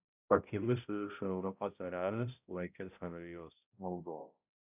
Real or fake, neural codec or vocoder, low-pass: fake; codec, 16 kHz, 0.5 kbps, X-Codec, HuBERT features, trained on balanced general audio; 3.6 kHz